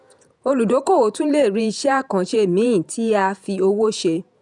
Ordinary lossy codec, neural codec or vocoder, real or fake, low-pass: none; vocoder, 48 kHz, 128 mel bands, Vocos; fake; 10.8 kHz